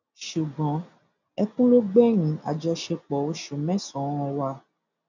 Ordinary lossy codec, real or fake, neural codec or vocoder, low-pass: none; real; none; 7.2 kHz